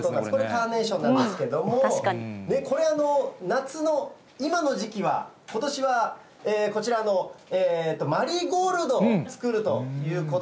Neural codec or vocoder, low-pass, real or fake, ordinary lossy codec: none; none; real; none